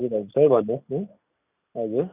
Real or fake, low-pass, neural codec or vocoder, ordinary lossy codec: real; 3.6 kHz; none; none